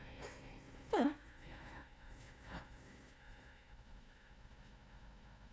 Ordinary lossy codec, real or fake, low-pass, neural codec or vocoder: none; fake; none; codec, 16 kHz, 1 kbps, FunCodec, trained on Chinese and English, 50 frames a second